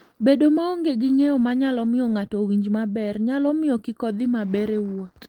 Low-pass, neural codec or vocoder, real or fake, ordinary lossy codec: 19.8 kHz; none; real; Opus, 24 kbps